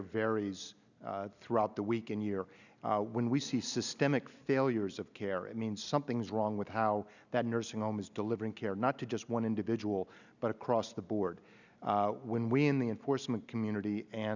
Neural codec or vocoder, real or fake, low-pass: none; real; 7.2 kHz